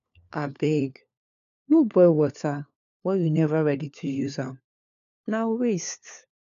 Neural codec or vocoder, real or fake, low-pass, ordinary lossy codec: codec, 16 kHz, 4 kbps, FunCodec, trained on LibriTTS, 50 frames a second; fake; 7.2 kHz; none